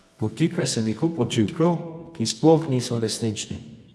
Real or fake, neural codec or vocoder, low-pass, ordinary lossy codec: fake; codec, 24 kHz, 0.9 kbps, WavTokenizer, medium music audio release; none; none